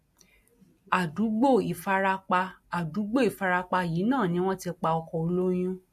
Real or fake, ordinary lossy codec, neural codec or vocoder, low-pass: real; MP3, 64 kbps; none; 14.4 kHz